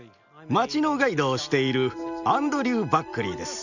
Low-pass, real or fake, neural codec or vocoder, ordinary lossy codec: 7.2 kHz; real; none; none